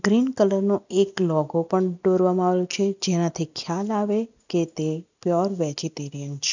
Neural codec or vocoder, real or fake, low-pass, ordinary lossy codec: none; real; 7.2 kHz; none